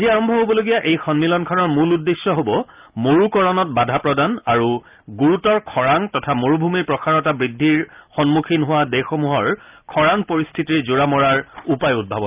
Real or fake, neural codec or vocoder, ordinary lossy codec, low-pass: real; none; Opus, 24 kbps; 3.6 kHz